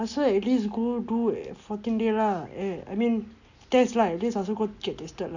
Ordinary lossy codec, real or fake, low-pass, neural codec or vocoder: none; real; 7.2 kHz; none